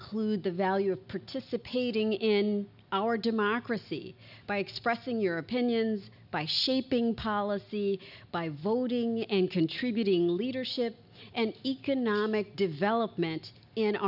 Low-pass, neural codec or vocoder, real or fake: 5.4 kHz; none; real